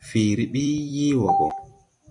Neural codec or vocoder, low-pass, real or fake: vocoder, 44.1 kHz, 128 mel bands every 256 samples, BigVGAN v2; 10.8 kHz; fake